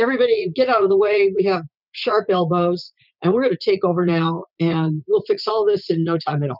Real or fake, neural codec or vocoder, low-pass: fake; vocoder, 44.1 kHz, 80 mel bands, Vocos; 5.4 kHz